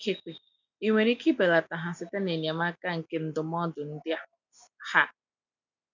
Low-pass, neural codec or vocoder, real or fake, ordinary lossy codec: 7.2 kHz; none; real; none